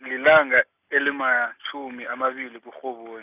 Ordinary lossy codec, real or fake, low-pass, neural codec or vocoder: none; real; 3.6 kHz; none